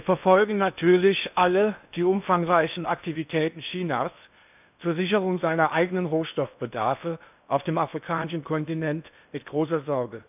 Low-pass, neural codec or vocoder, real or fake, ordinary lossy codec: 3.6 kHz; codec, 16 kHz in and 24 kHz out, 0.8 kbps, FocalCodec, streaming, 65536 codes; fake; none